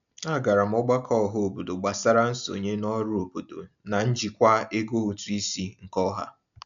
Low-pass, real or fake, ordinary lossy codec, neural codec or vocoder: 7.2 kHz; real; none; none